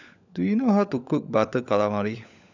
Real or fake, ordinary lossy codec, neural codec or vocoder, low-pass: fake; none; codec, 16 kHz, 16 kbps, FunCodec, trained on LibriTTS, 50 frames a second; 7.2 kHz